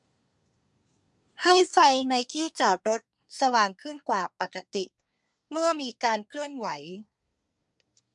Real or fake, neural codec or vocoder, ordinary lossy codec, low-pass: fake; codec, 24 kHz, 1 kbps, SNAC; MP3, 64 kbps; 10.8 kHz